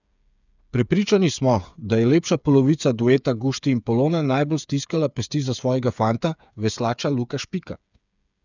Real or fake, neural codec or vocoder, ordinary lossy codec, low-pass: fake; codec, 16 kHz, 8 kbps, FreqCodec, smaller model; none; 7.2 kHz